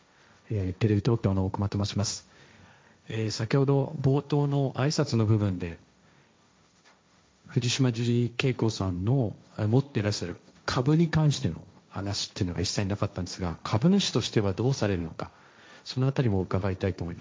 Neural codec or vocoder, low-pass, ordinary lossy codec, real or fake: codec, 16 kHz, 1.1 kbps, Voila-Tokenizer; none; none; fake